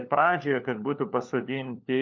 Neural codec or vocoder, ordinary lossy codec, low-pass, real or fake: codec, 16 kHz, 4 kbps, FunCodec, trained on LibriTTS, 50 frames a second; MP3, 48 kbps; 7.2 kHz; fake